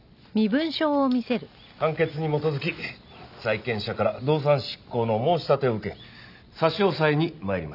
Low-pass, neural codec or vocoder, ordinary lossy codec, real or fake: 5.4 kHz; none; none; real